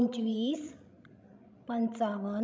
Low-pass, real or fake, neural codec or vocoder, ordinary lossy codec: none; fake; codec, 16 kHz, 16 kbps, FreqCodec, larger model; none